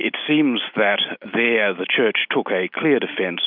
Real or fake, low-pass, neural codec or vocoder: real; 5.4 kHz; none